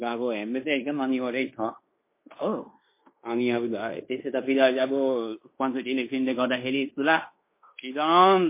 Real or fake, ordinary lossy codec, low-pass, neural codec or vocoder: fake; MP3, 24 kbps; 3.6 kHz; codec, 16 kHz in and 24 kHz out, 0.9 kbps, LongCat-Audio-Codec, fine tuned four codebook decoder